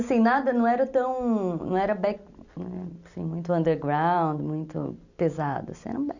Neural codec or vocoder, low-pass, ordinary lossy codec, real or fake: none; 7.2 kHz; none; real